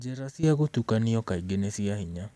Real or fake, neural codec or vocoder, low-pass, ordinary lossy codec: real; none; none; none